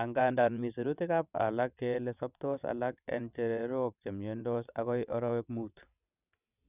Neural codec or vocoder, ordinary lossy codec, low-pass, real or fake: vocoder, 22.05 kHz, 80 mel bands, WaveNeXt; none; 3.6 kHz; fake